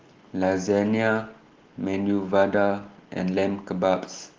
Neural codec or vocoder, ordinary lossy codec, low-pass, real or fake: none; Opus, 16 kbps; 7.2 kHz; real